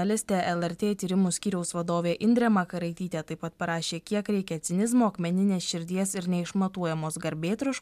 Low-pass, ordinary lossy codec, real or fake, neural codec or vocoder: 14.4 kHz; MP3, 96 kbps; real; none